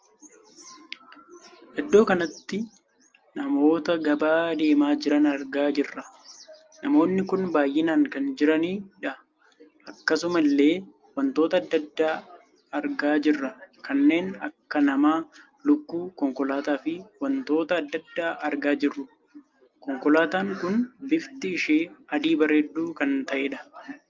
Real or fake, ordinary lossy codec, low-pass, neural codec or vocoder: real; Opus, 24 kbps; 7.2 kHz; none